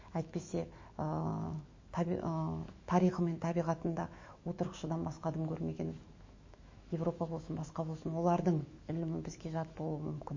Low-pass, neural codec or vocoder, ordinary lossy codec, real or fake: 7.2 kHz; autoencoder, 48 kHz, 128 numbers a frame, DAC-VAE, trained on Japanese speech; MP3, 32 kbps; fake